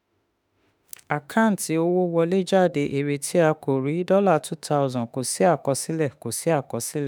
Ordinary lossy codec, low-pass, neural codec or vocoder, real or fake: none; none; autoencoder, 48 kHz, 32 numbers a frame, DAC-VAE, trained on Japanese speech; fake